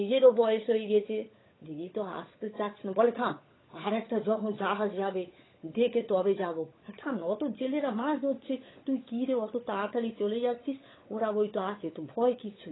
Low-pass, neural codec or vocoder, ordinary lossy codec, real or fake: 7.2 kHz; codec, 16 kHz, 16 kbps, FunCodec, trained on LibriTTS, 50 frames a second; AAC, 16 kbps; fake